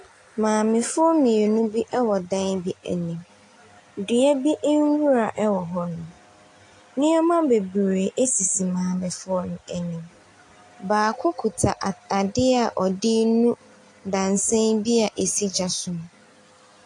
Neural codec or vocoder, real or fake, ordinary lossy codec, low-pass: none; real; AAC, 48 kbps; 10.8 kHz